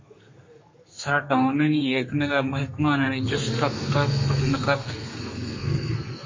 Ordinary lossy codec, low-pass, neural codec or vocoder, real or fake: MP3, 32 kbps; 7.2 kHz; codec, 32 kHz, 1.9 kbps, SNAC; fake